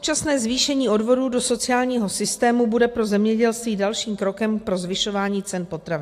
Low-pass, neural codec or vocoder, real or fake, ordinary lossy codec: 14.4 kHz; none; real; AAC, 64 kbps